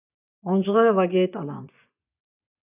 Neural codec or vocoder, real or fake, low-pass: vocoder, 24 kHz, 100 mel bands, Vocos; fake; 3.6 kHz